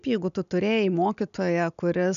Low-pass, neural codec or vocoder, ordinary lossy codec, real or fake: 7.2 kHz; none; MP3, 96 kbps; real